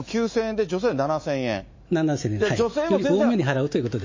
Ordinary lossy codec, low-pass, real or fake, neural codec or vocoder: MP3, 32 kbps; 7.2 kHz; fake; autoencoder, 48 kHz, 128 numbers a frame, DAC-VAE, trained on Japanese speech